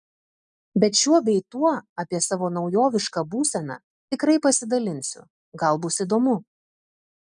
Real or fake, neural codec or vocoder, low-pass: real; none; 10.8 kHz